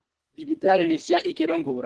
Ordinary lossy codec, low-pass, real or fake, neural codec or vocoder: Opus, 16 kbps; 10.8 kHz; fake; codec, 24 kHz, 1.5 kbps, HILCodec